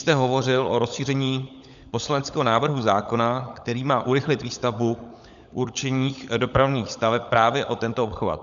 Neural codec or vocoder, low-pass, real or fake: codec, 16 kHz, 16 kbps, FunCodec, trained on LibriTTS, 50 frames a second; 7.2 kHz; fake